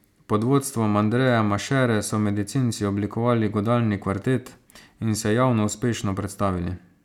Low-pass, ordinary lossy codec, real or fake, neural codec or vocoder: 19.8 kHz; none; real; none